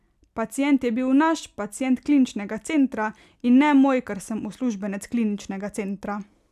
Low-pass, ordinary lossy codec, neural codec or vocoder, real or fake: 14.4 kHz; none; none; real